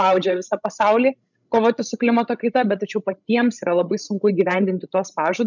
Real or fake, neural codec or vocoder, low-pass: fake; codec, 16 kHz, 16 kbps, FreqCodec, larger model; 7.2 kHz